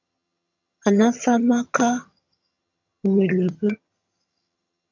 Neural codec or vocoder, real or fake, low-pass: vocoder, 22.05 kHz, 80 mel bands, HiFi-GAN; fake; 7.2 kHz